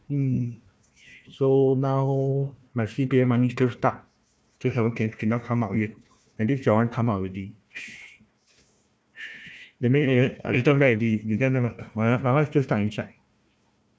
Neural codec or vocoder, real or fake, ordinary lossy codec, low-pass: codec, 16 kHz, 1 kbps, FunCodec, trained on Chinese and English, 50 frames a second; fake; none; none